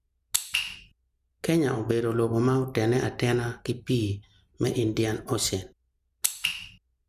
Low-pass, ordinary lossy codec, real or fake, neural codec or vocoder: 14.4 kHz; none; real; none